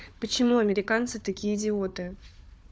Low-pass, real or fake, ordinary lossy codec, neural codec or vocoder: none; fake; none; codec, 16 kHz, 4 kbps, FunCodec, trained on Chinese and English, 50 frames a second